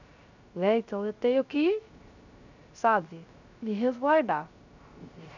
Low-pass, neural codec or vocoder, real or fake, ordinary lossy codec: 7.2 kHz; codec, 16 kHz, 0.3 kbps, FocalCodec; fake; none